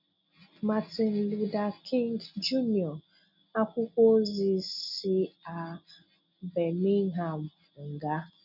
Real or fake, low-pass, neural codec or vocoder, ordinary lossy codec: real; 5.4 kHz; none; none